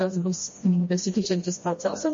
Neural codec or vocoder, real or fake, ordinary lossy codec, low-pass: codec, 16 kHz, 1 kbps, FreqCodec, smaller model; fake; MP3, 32 kbps; 7.2 kHz